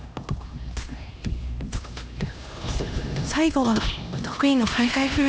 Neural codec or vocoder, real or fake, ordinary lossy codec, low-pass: codec, 16 kHz, 1 kbps, X-Codec, HuBERT features, trained on LibriSpeech; fake; none; none